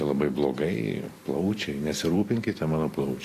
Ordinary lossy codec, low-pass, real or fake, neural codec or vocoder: AAC, 48 kbps; 14.4 kHz; fake; vocoder, 44.1 kHz, 128 mel bands every 256 samples, BigVGAN v2